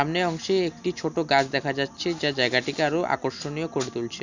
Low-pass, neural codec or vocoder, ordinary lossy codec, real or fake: 7.2 kHz; none; none; real